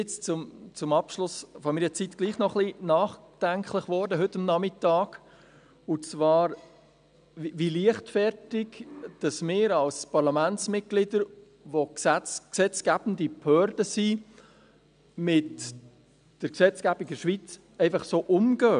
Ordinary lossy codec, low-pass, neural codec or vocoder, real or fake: none; 9.9 kHz; none; real